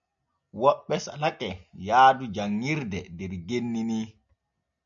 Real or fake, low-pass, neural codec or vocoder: real; 7.2 kHz; none